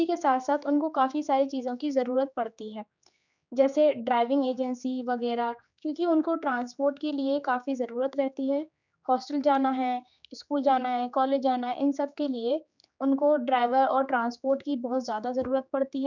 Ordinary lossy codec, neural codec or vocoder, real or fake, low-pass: none; codec, 16 kHz, 4 kbps, X-Codec, HuBERT features, trained on general audio; fake; 7.2 kHz